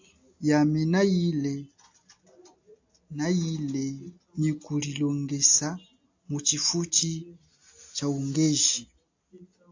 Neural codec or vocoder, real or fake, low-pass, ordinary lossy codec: none; real; 7.2 kHz; AAC, 48 kbps